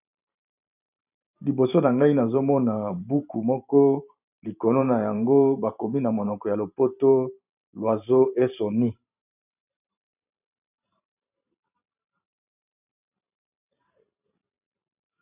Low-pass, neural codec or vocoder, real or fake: 3.6 kHz; vocoder, 44.1 kHz, 128 mel bands every 512 samples, BigVGAN v2; fake